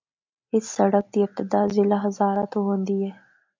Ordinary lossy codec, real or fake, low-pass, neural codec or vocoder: MP3, 64 kbps; real; 7.2 kHz; none